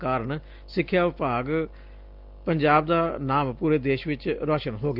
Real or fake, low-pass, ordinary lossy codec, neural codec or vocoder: real; 5.4 kHz; Opus, 32 kbps; none